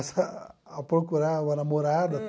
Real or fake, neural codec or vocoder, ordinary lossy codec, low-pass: real; none; none; none